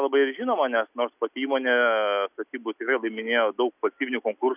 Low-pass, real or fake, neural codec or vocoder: 3.6 kHz; real; none